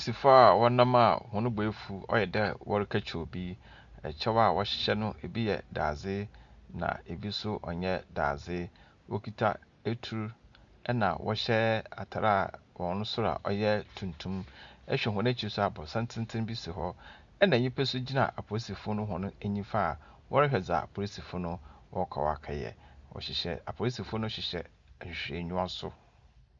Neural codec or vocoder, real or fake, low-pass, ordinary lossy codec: none; real; 7.2 kHz; Opus, 64 kbps